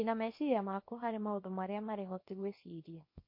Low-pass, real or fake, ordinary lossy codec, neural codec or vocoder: 5.4 kHz; fake; MP3, 32 kbps; codec, 24 kHz, 0.9 kbps, WavTokenizer, small release